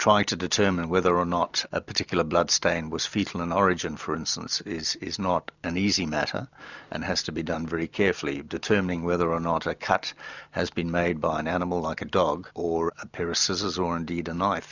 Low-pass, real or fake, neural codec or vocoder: 7.2 kHz; real; none